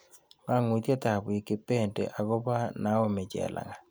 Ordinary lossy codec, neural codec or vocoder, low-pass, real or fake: none; none; none; real